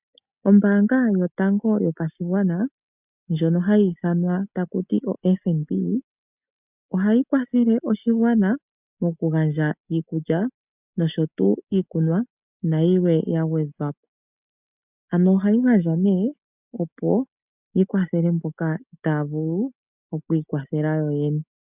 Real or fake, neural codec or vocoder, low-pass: real; none; 3.6 kHz